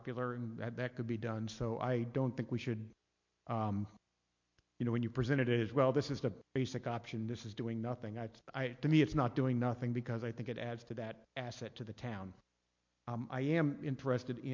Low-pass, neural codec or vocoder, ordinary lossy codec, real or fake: 7.2 kHz; none; MP3, 48 kbps; real